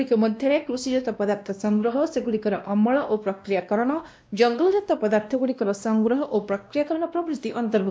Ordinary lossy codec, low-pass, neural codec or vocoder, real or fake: none; none; codec, 16 kHz, 1 kbps, X-Codec, WavLM features, trained on Multilingual LibriSpeech; fake